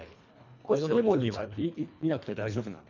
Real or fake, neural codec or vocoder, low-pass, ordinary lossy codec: fake; codec, 24 kHz, 1.5 kbps, HILCodec; 7.2 kHz; none